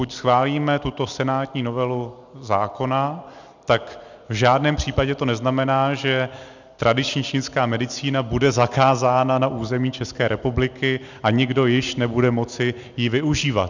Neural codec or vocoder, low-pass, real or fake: none; 7.2 kHz; real